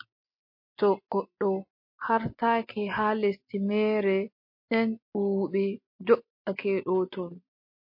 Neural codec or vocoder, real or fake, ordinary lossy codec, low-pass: none; real; MP3, 32 kbps; 5.4 kHz